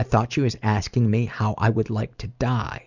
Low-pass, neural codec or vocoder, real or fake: 7.2 kHz; none; real